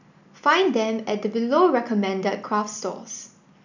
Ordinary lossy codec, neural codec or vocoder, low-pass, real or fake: none; none; 7.2 kHz; real